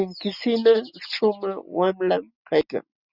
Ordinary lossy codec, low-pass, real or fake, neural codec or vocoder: Opus, 64 kbps; 5.4 kHz; real; none